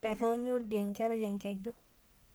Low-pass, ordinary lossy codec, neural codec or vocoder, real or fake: none; none; codec, 44.1 kHz, 1.7 kbps, Pupu-Codec; fake